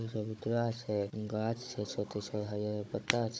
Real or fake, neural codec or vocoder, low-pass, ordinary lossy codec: fake; codec, 16 kHz, 16 kbps, FunCodec, trained on Chinese and English, 50 frames a second; none; none